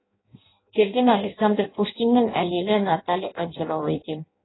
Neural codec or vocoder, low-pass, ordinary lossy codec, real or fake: codec, 16 kHz in and 24 kHz out, 0.6 kbps, FireRedTTS-2 codec; 7.2 kHz; AAC, 16 kbps; fake